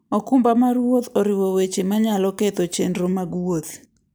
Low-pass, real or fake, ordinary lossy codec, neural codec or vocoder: none; real; none; none